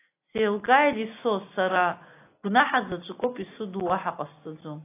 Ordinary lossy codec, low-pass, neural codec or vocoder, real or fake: AAC, 24 kbps; 3.6 kHz; none; real